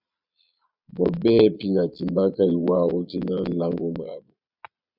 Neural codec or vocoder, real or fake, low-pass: vocoder, 22.05 kHz, 80 mel bands, Vocos; fake; 5.4 kHz